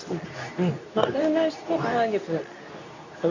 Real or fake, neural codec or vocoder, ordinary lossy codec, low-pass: fake; codec, 24 kHz, 0.9 kbps, WavTokenizer, medium speech release version 2; none; 7.2 kHz